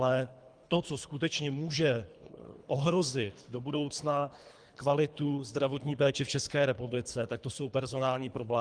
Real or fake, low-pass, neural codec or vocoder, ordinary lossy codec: fake; 9.9 kHz; codec, 24 kHz, 3 kbps, HILCodec; Opus, 32 kbps